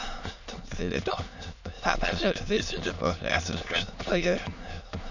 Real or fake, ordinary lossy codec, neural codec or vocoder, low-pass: fake; none; autoencoder, 22.05 kHz, a latent of 192 numbers a frame, VITS, trained on many speakers; 7.2 kHz